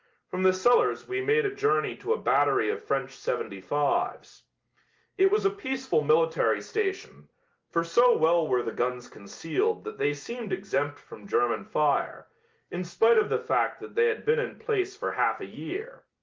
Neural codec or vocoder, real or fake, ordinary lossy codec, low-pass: none; real; Opus, 32 kbps; 7.2 kHz